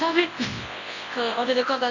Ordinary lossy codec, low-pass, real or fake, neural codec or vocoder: AAC, 48 kbps; 7.2 kHz; fake; codec, 24 kHz, 0.9 kbps, WavTokenizer, large speech release